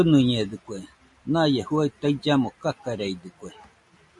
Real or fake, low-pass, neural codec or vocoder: real; 10.8 kHz; none